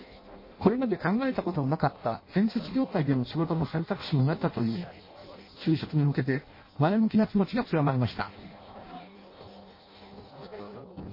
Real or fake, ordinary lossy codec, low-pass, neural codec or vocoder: fake; MP3, 24 kbps; 5.4 kHz; codec, 16 kHz in and 24 kHz out, 0.6 kbps, FireRedTTS-2 codec